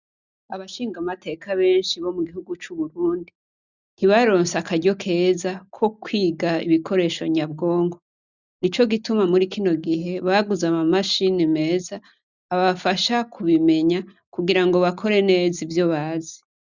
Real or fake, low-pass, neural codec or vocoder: real; 7.2 kHz; none